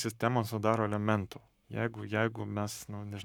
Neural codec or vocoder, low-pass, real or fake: codec, 44.1 kHz, 7.8 kbps, Pupu-Codec; 19.8 kHz; fake